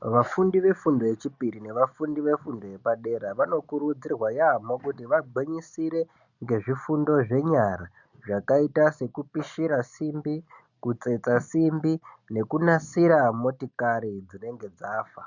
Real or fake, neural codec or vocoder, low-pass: real; none; 7.2 kHz